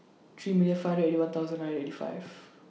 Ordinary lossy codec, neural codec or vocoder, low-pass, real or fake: none; none; none; real